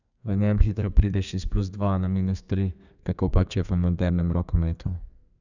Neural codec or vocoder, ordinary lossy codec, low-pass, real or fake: codec, 32 kHz, 1.9 kbps, SNAC; none; 7.2 kHz; fake